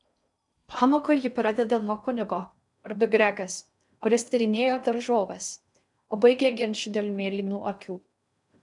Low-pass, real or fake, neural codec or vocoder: 10.8 kHz; fake; codec, 16 kHz in and 24 kHz out, 0.6 kbps, FocalCodec, streaming, 4096 codes